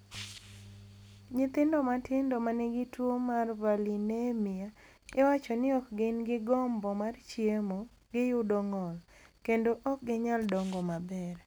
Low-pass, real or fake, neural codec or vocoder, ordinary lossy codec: none; real; none; none